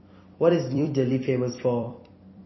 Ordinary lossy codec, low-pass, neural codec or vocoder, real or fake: MP3, 24 kbps; 7.2 kHz; vocoder, 44.1 kHz, 128 mel bands every 256 samples, BigVGAN v2; fake